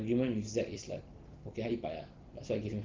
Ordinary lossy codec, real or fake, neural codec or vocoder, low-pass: Opus, 16 kbps; real; none; 7.2 kHz